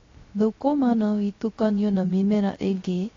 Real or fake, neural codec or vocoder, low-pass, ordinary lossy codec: fake; codec, 16 kHz, 0.3 kbps, FocalCodec; 7.2 kHz; AAC, 32 kbps